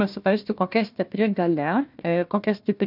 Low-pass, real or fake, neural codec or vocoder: 5.4 kHz; fake; codec, 16 kHz, 1 kbps, FunCodec, trained on Chinese and English, 50 frames a second